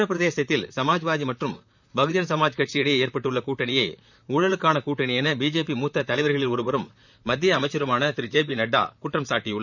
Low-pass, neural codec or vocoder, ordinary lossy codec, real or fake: 7.2 kHz; vocoder, 44.1 kHz, 128 mel bands, Pupu-Vocoder; none; fake